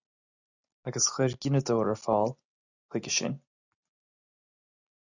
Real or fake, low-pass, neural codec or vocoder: real; 7.2 kHz; none